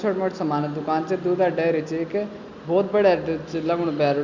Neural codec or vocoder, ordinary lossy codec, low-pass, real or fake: none; Opus, 64 kbps; 7.2 kHz; real